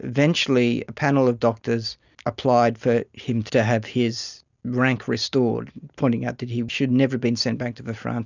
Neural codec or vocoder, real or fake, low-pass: none; real; 7.2 kHz